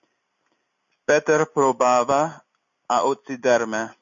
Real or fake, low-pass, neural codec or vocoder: real; 7.2 kHz; none